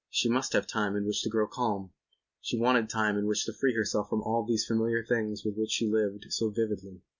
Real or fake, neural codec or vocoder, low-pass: real; none; 7.2 kHz